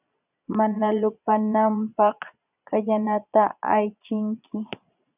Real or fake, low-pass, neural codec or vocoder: fake; 3.6 kHz; vocoder, 22.05 kHz, 80 mel bands, Vocos